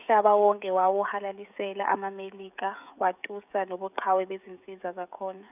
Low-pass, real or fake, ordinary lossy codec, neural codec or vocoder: 3.6 kHz; fake; none; codec, 16 kHz, 16 kbps, FreqCodec, smaller model